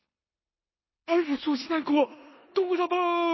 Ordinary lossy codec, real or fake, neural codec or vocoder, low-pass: MP3, 24 kbps; fake; codec, 16 kHz in and 24 kHz out, 0.9 kbps, LongCat-Audio-Codec, four codebook decoder; 7.2 kHz